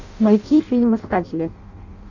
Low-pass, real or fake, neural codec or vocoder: 7.2 kHz; fake; codec, 16 kHz in and 24 kHz out, 0.6 kbps, FireRedTTS-2 codec